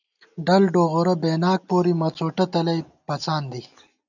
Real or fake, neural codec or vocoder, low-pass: real; none; 7.2 kHz